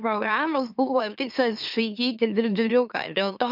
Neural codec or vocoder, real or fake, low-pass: autoencoder, 44.1 kHz, a latent of 192 numbers a frame, MeloTTS; fake; 5.4 kHz